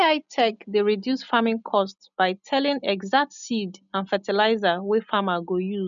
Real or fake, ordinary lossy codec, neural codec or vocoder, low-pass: real; none; none; 7.2 kHz